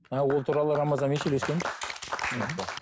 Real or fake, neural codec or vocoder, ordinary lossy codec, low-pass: real; none; none; none